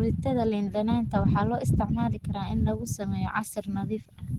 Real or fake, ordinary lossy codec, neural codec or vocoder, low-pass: real; Opus, 16 kbps; none; 19.8 kHz